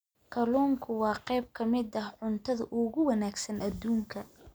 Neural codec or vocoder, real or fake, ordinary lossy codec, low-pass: none; real; none; none